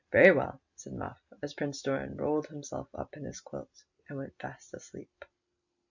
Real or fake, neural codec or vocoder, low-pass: real; none; 7.2 kHz